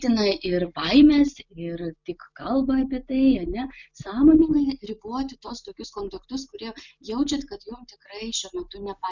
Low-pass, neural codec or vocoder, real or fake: 7.2 kHz; none; real